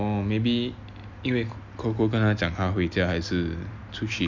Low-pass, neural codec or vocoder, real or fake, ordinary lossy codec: 7.2 kHz; none; real; none